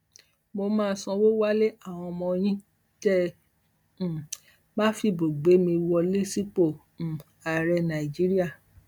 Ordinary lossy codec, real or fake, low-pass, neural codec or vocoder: none; real; none; none